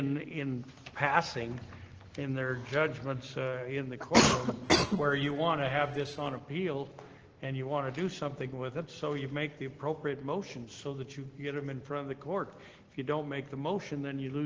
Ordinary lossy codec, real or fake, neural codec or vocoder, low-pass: Opus, 16 kbps; real; none; 7.2 kHz